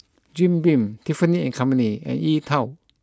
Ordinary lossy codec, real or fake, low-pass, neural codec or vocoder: none; real; none; none